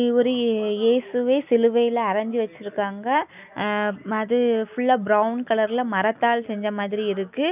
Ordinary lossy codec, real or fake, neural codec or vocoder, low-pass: none; real; none; 3.6 kHz